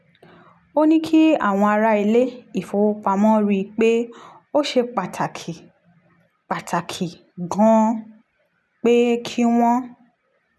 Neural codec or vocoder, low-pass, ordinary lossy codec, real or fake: none; none; none; real